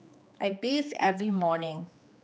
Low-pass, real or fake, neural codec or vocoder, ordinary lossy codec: none; fake; codec, 16 kHz, 2 kbps, X-Codec, HuBERT features, trained on general audio; none